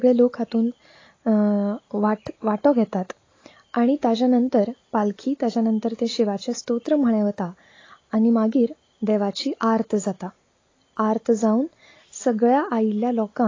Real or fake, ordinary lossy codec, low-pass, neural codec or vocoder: real; AAC, 32 kbps; 7.2 kHz; none